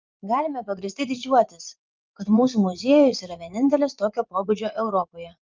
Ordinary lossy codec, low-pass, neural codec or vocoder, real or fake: Opus, 24 kbps; 7.2 kHz; vocoder, 44.1 kHz, 80 mel bands, Vocos; fake